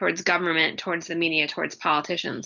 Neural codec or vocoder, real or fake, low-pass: none; real; 7.2 kHz